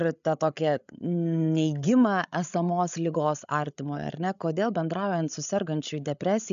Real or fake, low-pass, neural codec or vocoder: fake; 7.2 kHz; codec, 16 kHz, 8 kbps, FreqCodec, larger model